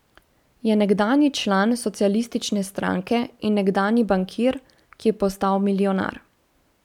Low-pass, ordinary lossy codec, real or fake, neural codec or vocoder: 19.8 kHz; none; real; none